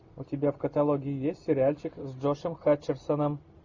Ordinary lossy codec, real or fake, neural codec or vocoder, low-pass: Opus, 64 kbps; real; none; 7.2 kHz